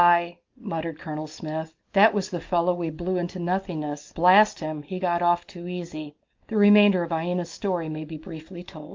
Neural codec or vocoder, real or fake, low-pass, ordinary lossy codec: none; real; 7.2 kHz; Opus, 32 kbps